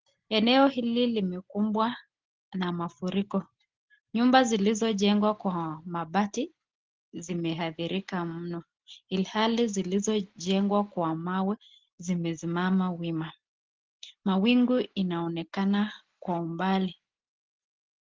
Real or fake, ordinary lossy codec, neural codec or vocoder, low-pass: real; Opus, 16 kbps; none; 7.2 kHz